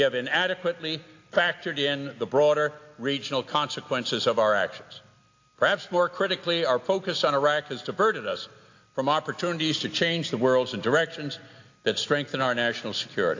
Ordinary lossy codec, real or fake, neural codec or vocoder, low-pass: AAC, 48 kbps; real; none; 7.2 kHz